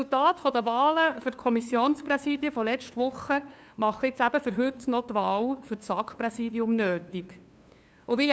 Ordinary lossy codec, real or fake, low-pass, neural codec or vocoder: none; fake; none; codec, 16 kHz, 2 kbps, FunCodec, trained on LibriTTS, 25 frames a second